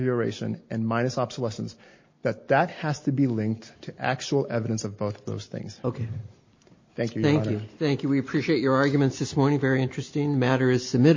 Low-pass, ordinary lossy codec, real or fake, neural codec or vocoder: 7.2 kHz; MP3, 32 kbps; real; none